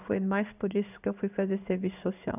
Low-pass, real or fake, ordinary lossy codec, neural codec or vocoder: 3.6 kHz; fake; none; codec, 16 kHz in and 24 kHz out, 1 kbps, XY-Tokenizer